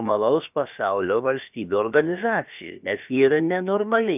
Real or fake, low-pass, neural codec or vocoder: fake; 3.6 kHz; codec, 16 kHz, about 1 kbps, DyCAST, with the encoder's durations